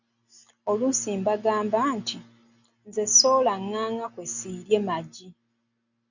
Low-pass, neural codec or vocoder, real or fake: 7.2 kHz; none; real